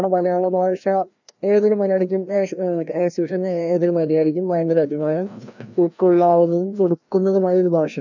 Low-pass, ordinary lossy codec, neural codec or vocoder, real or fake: 7.2 kHz; none; codec, 16 kHz, 1 kbps, FreqCodec, larger model; fake